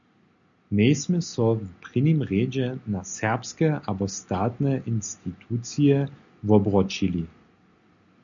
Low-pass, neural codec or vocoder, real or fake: 7.2 kHz; none; real